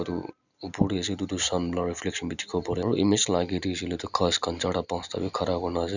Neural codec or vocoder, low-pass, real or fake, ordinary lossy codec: none; 7.2 kHz; real; none